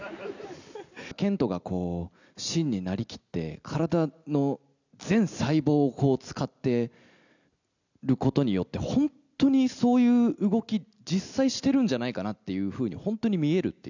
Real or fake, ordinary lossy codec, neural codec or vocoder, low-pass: real; none; none; 7.2 kHz